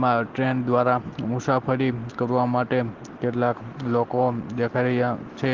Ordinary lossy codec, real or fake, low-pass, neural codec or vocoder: Opus, 16 kbps; real; 7.2 kHz; none